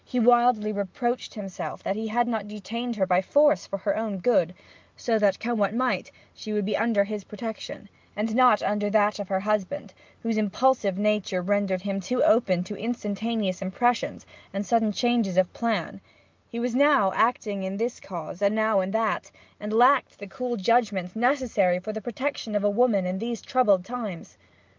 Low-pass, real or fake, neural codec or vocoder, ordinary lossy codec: 7.2 kHz; real; none; Opus, 24 kbps